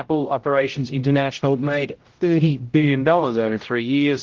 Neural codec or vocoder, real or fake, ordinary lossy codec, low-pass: codec, 16 kHz, 0.5 kbps, X-Codec, HuBERT features, trained on general audio; fake; Opus, 16 kbps; 7.2 kHz